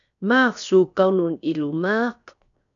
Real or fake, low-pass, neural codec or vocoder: fake; 7.2 kHz; codec, 16 kHz, 0.7 kbps, FocalCodec